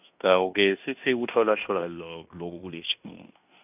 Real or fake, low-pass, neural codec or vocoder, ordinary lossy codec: fake; 3.6 kHz; codec, 16 kHz in and 24 kHz out, 0.9 kbps, LongCat-Audio-Codec, fine tuned four codebook decoder; none